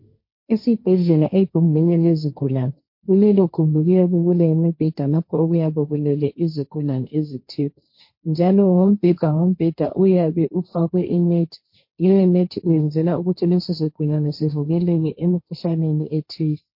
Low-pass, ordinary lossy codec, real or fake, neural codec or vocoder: 5.4 kHz; MP3, 32 kbps; fake; codec, 16 kHz, 1.1 kbps, Voila-Tokenizer